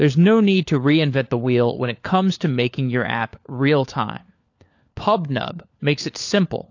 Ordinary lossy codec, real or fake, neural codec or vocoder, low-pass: AAC, 48 kbps; fake; codec, 16 kHz, 4 kbps, FunCodec, trained on LibriTTS, 50 frames a second; 7.2 kHz